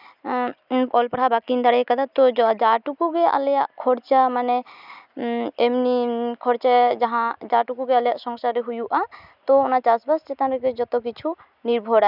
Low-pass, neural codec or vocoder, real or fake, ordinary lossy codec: 5.4 kHz; none; real; none